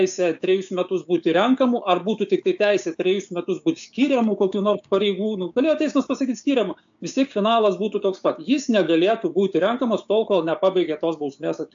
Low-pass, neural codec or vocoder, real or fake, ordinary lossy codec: 7.2 kHz; codec, 16 kHz, 6 kbps, DAC; fake; AAC, 64 kbps